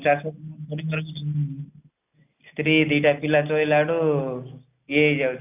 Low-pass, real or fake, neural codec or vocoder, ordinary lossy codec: 3.6 kHz; real; none; AAC, 32 kbps